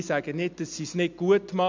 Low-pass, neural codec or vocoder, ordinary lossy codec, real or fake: 7.2 kHz; none; MP3, 64 kbps; real